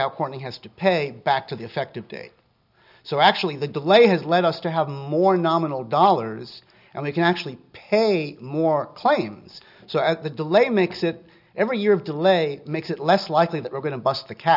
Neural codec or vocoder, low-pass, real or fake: none; 5.4 kHz; real